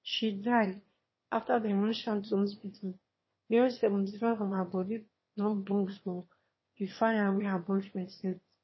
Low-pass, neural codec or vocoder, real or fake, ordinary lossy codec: 7.2 kHz; autoencoder, 22.05 kHz, a latent of 192 numbers a frame, VITS, trained on one speaker; fake; MP3, 24 kbps